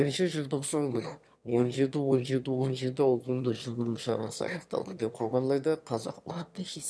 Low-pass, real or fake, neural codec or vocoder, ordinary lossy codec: none; fake; autoencoder, 22.05 kHz, a latent of 192 numbers a frame, VITS, trained on one speaker; none